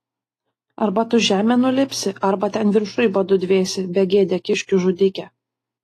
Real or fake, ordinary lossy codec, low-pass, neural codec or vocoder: real; AAC, 48 kbps; 14.4 kHz; none